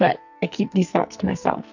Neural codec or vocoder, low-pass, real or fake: codec, 44.1 kHz, 2.6 kbps, SNAC; 7.2 kHz; fake